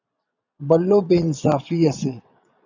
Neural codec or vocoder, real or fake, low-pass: vocoder, 22.05 kHz, 80 mel bands, Vocos; fake; 7.2 kHz